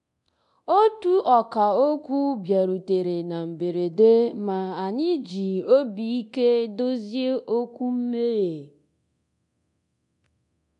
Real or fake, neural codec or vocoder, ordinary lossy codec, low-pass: fake; codec, 24 kHz, 0.9 kbps, DualCodec; AAC, 96 kbps; 10.8 kHz